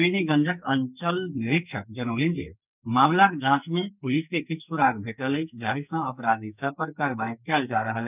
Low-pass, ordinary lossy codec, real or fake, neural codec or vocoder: 3.6 kHz; none; fake; codec, 44.1 kHz, 2.6 kbps, SNAC